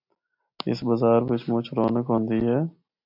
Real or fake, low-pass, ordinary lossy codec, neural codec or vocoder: real; 5.4 kHz; AAC, 48 kbps; none